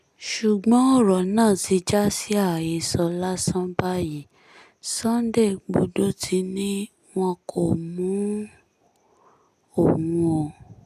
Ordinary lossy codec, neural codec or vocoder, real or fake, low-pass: none; none; real; 14.4 kHz